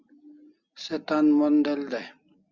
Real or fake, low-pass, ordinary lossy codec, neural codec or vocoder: real; 7.2 kHz; Opus, 64 kbps; none